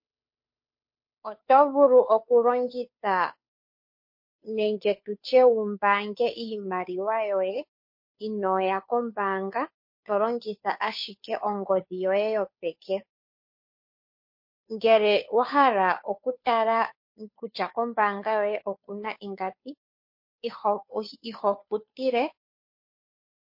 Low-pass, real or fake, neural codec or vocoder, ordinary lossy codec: 5.4 kHz; fake; codec, 16 kHz, 2 kbps, FunCodec, trained on Chinese and English, 25 frames a second; MP3, 32 kbps